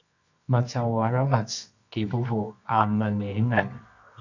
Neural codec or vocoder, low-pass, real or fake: codec, 24 kHz, 0.9 kbps, WavTokenizer, medium music audio release; 7.2 kHz; fake